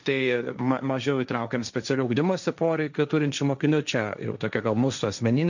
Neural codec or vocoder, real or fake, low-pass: codec, 16 kHz, 1.1 kbps, Voila-Tokenizer; fake; 7.2 kHz